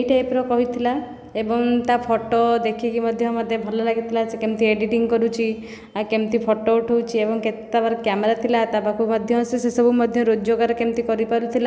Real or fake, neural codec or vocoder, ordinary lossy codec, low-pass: real; none; none; none